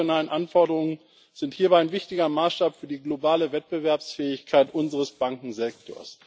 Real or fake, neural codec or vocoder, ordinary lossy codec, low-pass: real; none; none; none